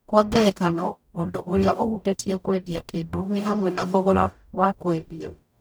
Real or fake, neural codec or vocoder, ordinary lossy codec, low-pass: fake; codec, 44.1 kHz, 0.9 kbps, DAC; none; none